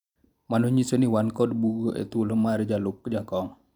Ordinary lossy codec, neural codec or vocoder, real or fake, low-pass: none; none; real; 19.8 kHz